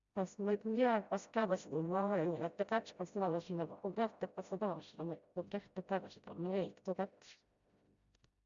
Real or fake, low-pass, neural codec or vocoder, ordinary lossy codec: fake; 7.2 kHz; codec, 16 kHz, 0.5 kbps, FreqCodec, smaller model; Opus, 64 kbps